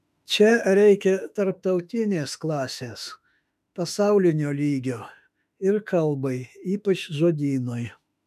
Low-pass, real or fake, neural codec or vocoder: 14.4 kHz; fake; autoencoder, 48 kHz, 32 numbers a frame, DAC-VAE, trained on Japanese speech